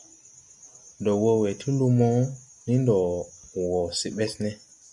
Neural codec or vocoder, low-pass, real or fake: none; 10.8 kHz; real